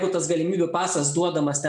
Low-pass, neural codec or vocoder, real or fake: 10.8 kHz; none; real